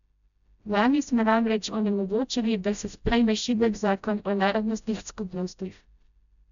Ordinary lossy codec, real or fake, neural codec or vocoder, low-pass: none; fake; codec, 16 kHz, 0.5 kbps, FreqCodec, smaller model; 7.2 kHz